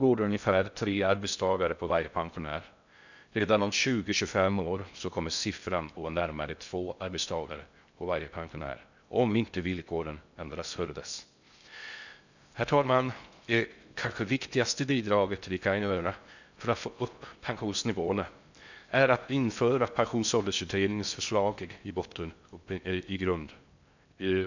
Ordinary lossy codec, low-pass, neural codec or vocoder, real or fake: none; 7.2 kHz; codec, 16 kHz in and 24 kHz out, 0.6 kbps, FocalCodec, streaming, 2048 codes; fake